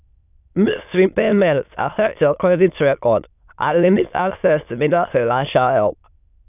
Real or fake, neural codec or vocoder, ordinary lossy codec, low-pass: fake; autoencoder, 22.05 kHz, a latent of 192 numbers a frame, VITS, trained on many speakers; none; 3.6 kHz